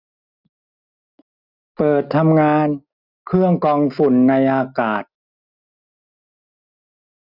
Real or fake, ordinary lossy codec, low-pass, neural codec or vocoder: real; none; 5.4 kHz; none